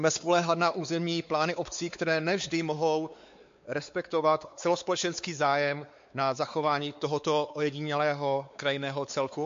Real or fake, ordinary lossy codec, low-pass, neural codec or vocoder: fake; MP3, 48 kbps; 7.2 kHz; codec, 16 kHz, 4 kbps, X-Codec, WavLM features, trained on Multilingual LibriSpeech